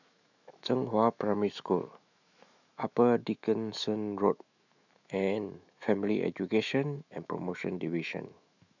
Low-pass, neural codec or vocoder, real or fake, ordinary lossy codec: 7.2 kHz; autoencoder, 48 kHz, 128 numbers a frame, DAC-VAE, trained on Japanese speech; fake; none